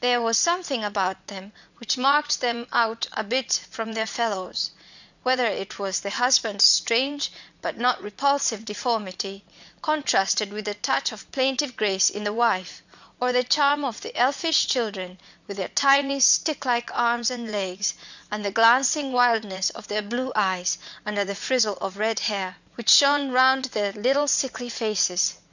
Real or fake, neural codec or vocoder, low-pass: fake; vocoder, 44.1 kHz, 80 mel bands, Vocos; 7.2 kHz